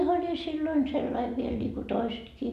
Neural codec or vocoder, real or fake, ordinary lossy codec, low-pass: none; real; none; 14.4 kHz